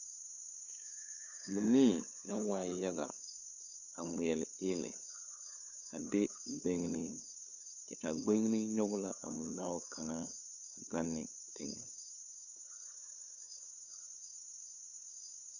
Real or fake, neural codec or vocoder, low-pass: fake; codec, 16 kHz, 8 kbps, FunCodec, trained on LibriTTS, 25 frames a second; 7.2 kHz